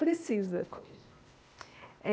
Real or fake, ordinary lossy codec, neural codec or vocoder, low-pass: fake; none; codec, 16 kHz, 0.8 kbps, ZipCodec; none